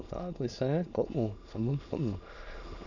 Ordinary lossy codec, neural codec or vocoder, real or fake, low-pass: none; autoencoder, 22.05 kHz, a latent of 192 numbers a frame, VITS, trained on many speakers; fake; 7.2 kHz